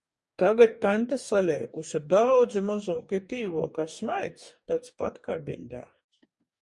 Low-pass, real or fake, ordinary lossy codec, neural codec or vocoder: 10.8 kHz; fake; Opus, 64 kbps; codec, 44.1 kHz, 2.6 kbps, DAC